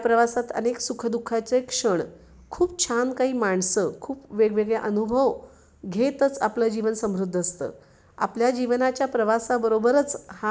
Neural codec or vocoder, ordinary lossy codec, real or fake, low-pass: none; none; real; none